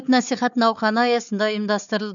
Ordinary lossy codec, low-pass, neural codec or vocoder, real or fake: none; 7.2 kHz; codec, 16 kHz, 4 kbps, FunCodec, trained on Chinese and English, 50 frames a second; fake